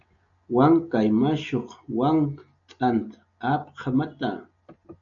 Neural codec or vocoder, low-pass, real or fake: none; 7.2 kHz; real